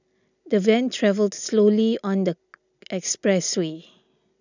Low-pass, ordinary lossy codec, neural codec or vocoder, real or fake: 7.2 kHz; none; none; real